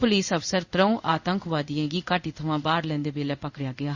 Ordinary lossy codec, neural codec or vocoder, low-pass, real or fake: Opus, 64 kbps; codec, 16 kHz in and 24 kHz out, 1 kbps, XY-Tokenizer; 7.2 kHz; fake